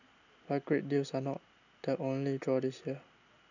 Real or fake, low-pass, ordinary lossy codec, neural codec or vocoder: real; 7.2 kHz; none; none